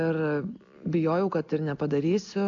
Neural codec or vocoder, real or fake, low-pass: none; real; 7.2 kHz